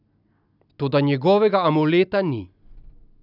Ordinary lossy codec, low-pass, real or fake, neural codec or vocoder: none; 5.4 kHz; real; none